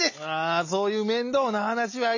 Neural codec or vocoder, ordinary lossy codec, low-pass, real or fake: none; none; 7.2 kHz; real